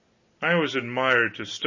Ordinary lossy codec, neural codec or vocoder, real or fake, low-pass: MP3, 32 kbps; none; real; 7.2 kHz